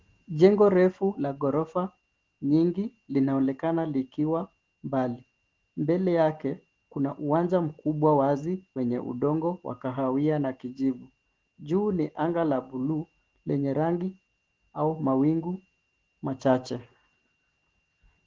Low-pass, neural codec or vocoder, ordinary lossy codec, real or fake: 7.2 kHz; none; Opus, 16 kbps; real